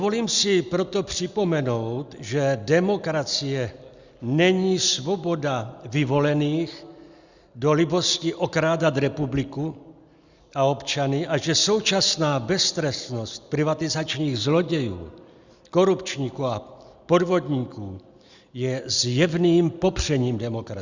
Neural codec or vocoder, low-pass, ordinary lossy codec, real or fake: none; 7.2 kHz; Opus, 64 kbps; real